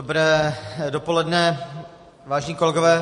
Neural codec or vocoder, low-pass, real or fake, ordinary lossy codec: none; 14.4 kHz; real; MP3, 48 kbps